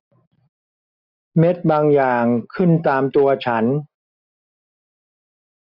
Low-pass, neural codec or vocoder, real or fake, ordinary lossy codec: 5.4 kHz; none; real; none